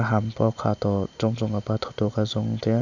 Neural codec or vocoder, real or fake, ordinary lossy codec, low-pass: none; real; none; 7.2 kHz